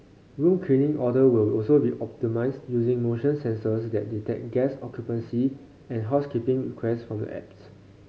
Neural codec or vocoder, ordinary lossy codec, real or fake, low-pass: none; none; real; none